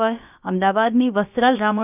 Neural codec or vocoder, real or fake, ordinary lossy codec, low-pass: codec, 16 kHz, about 1 kbps, DyCAST, with the encoder's durations; fake; none; 3.6 kHz